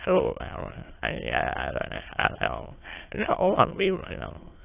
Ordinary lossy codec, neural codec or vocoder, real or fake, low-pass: AAC, 24 kbps; autoencoder, 22.05 kHz, a latent of 192 numbers a frame, VITS, trained on many speakers; fake; 3.6 kHz